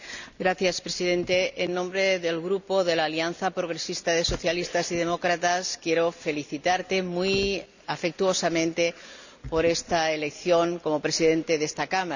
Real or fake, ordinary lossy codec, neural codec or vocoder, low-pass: real; none; none; 7.2 kHz